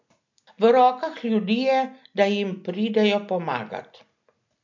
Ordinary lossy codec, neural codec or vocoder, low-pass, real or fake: MP3, 48 kbps; none; 7.2 kHz; real